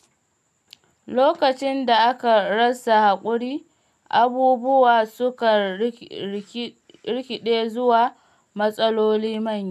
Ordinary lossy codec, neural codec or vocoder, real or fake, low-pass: none; none; real; 14.4 kHz